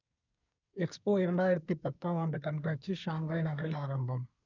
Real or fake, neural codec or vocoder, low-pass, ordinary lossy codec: fake; codec, 24 kHz, 1 kbps, SNAC; 7.2 kHz; none